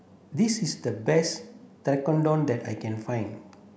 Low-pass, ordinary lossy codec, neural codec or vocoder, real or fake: none; none; none; real